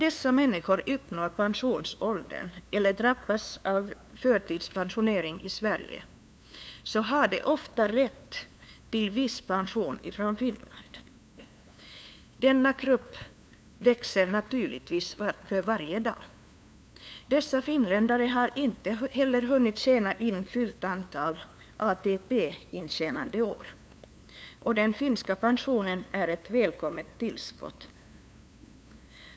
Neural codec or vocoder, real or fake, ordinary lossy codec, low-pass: codec, 16 kHz, 2 kbps, FunCodec, trained on LibriTTS, 25 frames a second; fake; none; none